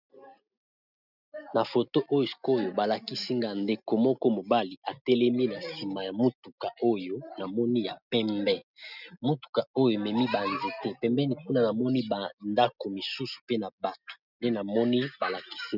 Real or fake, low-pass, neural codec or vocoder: real; 5.4 kHz; none